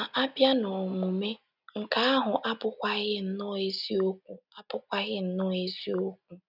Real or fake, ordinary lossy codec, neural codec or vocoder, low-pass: real; none; none; 5.4 kHz